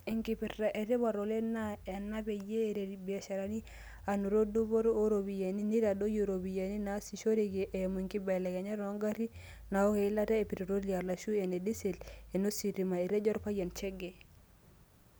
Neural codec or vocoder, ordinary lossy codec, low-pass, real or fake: none; none; none; real